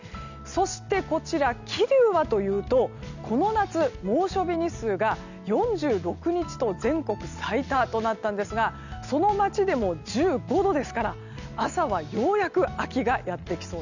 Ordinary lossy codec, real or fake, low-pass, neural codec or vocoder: none; real; 7.2 kHz; none